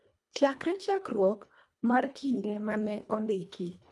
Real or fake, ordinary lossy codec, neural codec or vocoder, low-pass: fake; none; codec, 24 kHz, 1.5 kbps, HILCodec; none